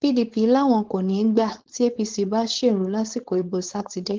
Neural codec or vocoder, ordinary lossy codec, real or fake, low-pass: codec, 16 kHz, 4.8 kbps, FACodec; Opus, 16 kbps; fake; 7.2 kHz